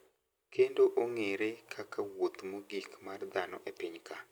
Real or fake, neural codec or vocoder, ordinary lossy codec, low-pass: real; none; none; none